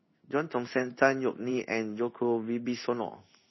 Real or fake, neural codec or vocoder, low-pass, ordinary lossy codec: fake; codec, 16 kHz in and 24 kHz out, 1 kbps, XY-Tokenizer; 7.2 kHz; MP3, 24 kbps